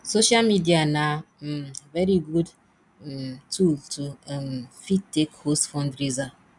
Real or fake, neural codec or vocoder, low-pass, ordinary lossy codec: real; none; 10.8 kHz; none